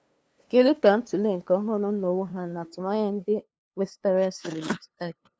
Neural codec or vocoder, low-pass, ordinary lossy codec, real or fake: codec, 16 kHz, 2 kbps, FunCodec, trained on LibriTTS, 25 frames a second; none; none; fake